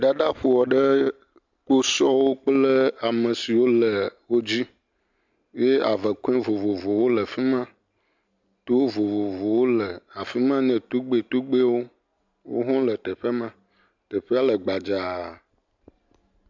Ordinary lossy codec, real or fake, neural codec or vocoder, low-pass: MP3, 64 kbps; real; none; 7.2 kHz